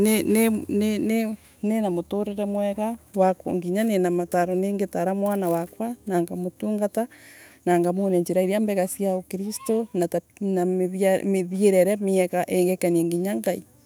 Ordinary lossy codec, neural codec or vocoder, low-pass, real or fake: none; autoencoder, 48 kHz, 128 numbers a frame, DAC-VAE, trained on Japanese speech; none; fake